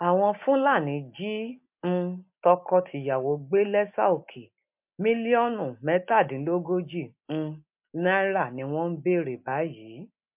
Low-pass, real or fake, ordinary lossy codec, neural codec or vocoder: 3.6 kHz; real; MP3, 32 kbps; none